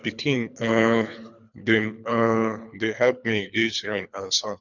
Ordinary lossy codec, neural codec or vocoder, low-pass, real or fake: none; codec, 24 kHz, 3 kbps, HILCodec; 7.2 kHz; fake